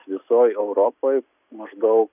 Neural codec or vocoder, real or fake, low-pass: none; real; 3.6 kHz